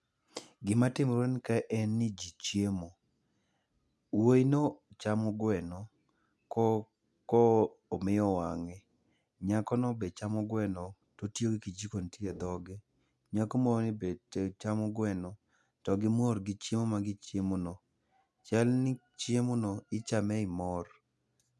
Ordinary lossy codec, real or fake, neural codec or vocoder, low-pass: none; real; none; none